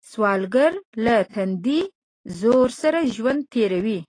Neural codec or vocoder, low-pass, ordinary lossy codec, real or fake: none; 9.9 kHz; AAC, 32 kbps; real